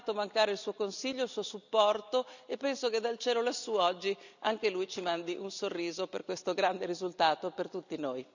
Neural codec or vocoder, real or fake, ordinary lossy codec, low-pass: none; real; none; 7.2 kHz